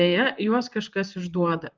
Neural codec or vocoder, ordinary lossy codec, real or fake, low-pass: vocoder, 44.1 kHz, 128 mel bands, Pupu-Vocoder; Opus, 32 kbps; fake; 7.2 kHz